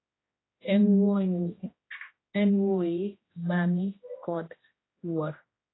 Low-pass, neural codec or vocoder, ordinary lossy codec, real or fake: 7.2 kHz; codec, 16 kHz, 1 kbps, X-Codec, HuBERT features, trained on general audio; AAC, 16 kbps; fake